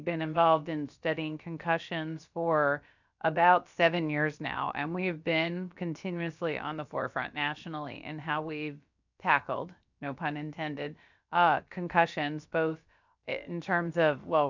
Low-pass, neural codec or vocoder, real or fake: 7.2 kHz; codec, 16 kHz, about 1 kbps, DyCAST, with the encoder's durations; fake